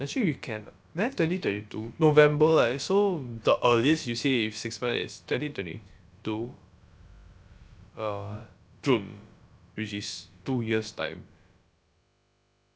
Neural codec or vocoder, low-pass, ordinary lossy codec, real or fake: codec, 16 kHz, about 1 kbps, DyCAST, with the encoder's durations; none; none; fake